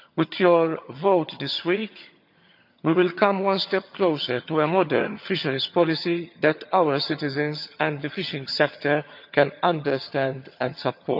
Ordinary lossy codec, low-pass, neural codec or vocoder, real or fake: none; 5.4 kHz; vocoder, 22.05 kHz, 80 mel bands, HiFi-GAN; fake